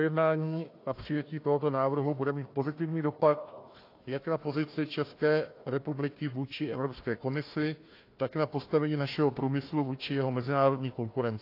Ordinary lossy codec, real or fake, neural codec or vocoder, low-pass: AAC, 32 kbps; fake; codec, 16 kHz, 1 kbps, FunCodec, trained on Chinese and English, 50 frames a second; 5.4 kHz